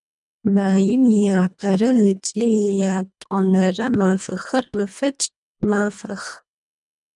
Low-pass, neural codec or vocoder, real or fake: 10.8 kHz; codec, 24 kHz, 1.5 kbps, HILCodec; fake